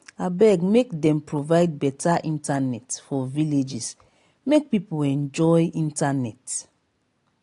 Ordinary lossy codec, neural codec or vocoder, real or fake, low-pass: AAC, 48 kbps; none; real; 10.8 kHz